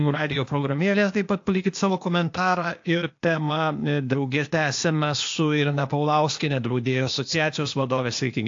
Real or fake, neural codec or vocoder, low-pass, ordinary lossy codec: fake; codec, 16 kHz, 0.8 kbps, ZipCodec; 7.2 kHz; AAC, 48 kbps